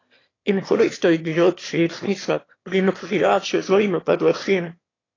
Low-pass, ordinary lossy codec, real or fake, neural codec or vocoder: 7.2 kHz; AAC, 32 kbps; fake; autoencoder, 22.05 kHz, a latent of 192 numbers a frame, VITS, trained on one speaker